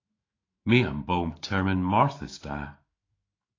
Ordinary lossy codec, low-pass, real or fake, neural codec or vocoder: AAC, 32 kbps; 7.2 kHz; fake; autoencoder, 48 kHz, 128 numbers a frame, DAC-VAE, trained on Japanese speech